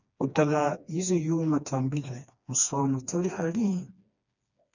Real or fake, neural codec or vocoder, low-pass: fake; codec, 16 kHz, 2 kbps, FreqCodec, smaller model; 7.2 kHz